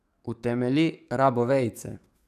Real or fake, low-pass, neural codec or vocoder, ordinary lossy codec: fake; 14.4 kHz; codec, 44.1 kHz, 7.8 kbps, DAC; none